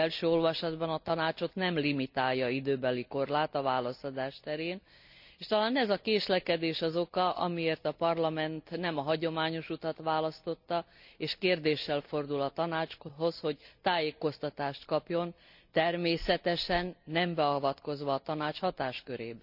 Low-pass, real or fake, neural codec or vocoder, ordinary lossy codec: 5.4 kHz; real; none; none